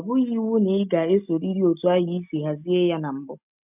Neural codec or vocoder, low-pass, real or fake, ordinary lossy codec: none; 3.6 kHz; real; none